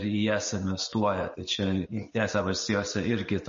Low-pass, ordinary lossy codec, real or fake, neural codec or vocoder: 7.2 kHz; MP3, 32 kbps; real; none